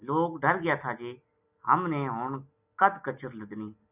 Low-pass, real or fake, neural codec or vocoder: 3.6 kHz; real; none